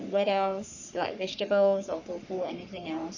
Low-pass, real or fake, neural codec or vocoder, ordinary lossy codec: 7.2 kHz; fake; codec, 44.1 kHz, 3.4 kbps, Pupu-Codec; none